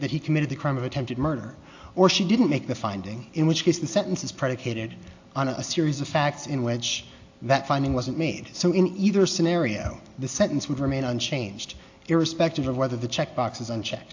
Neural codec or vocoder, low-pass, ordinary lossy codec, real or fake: none; 7.2 kHz; AAC, 48 kbps; real